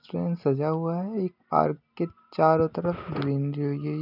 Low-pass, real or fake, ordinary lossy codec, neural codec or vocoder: 5.4 kHz; real; none; none